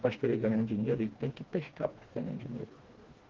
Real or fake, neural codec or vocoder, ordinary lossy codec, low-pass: fake; codec, 16 kHz, 2 kbps, FreqCodec, smaller model; Opus, 16 kbps; 7.2 kHz